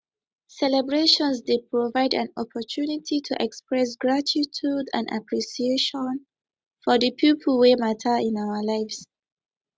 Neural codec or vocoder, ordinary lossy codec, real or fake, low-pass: none; none; real; none